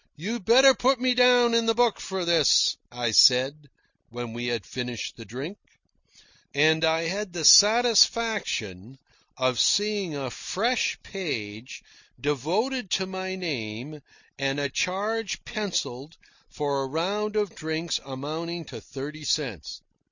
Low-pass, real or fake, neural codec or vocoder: 7.2 kHz; real; none